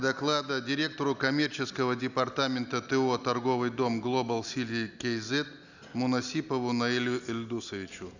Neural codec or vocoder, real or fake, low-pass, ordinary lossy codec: none; real; 7.2 kHz; none